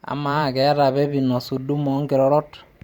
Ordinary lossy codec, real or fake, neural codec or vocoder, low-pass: Opus, 64 kbps; fake; vocoder, 44.1 kHz, 128 mel bands every 512 samples, BigVGAN v2; 19.8 kHz